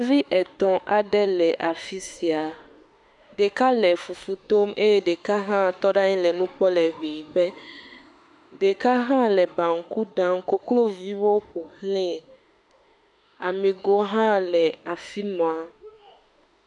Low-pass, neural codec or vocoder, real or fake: 10.8 kHz; autoencoder, 48 kHz, 32 numbers a frame, DAC-VAE, trained on Japanese speech; fake